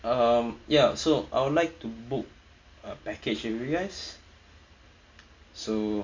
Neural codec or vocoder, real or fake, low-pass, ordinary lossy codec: none; real; 7.2 kHz; MP3, 48 kbps